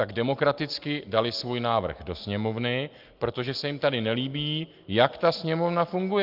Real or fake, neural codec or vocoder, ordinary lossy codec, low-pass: real; none; Opus, 32 kbps; 5.4 kHz